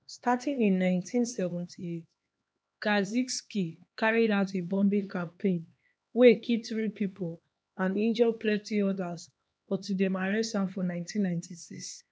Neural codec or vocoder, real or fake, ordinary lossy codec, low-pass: codec, 16 kHz, 2 kbps, X-Codec, HuBERT features, trained on LibriSpeech; fake; none; none